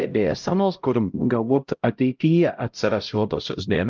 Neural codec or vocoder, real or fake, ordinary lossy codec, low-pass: codec, 16 kHz, 0.5 kbps, X-Codec, HuBERT features, trained on LibriSpeech; fake; Opus, 32 kbps; 7.2 kHz